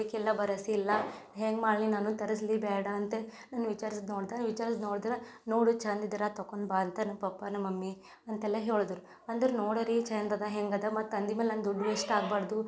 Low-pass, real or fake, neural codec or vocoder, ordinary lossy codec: none; real; none; none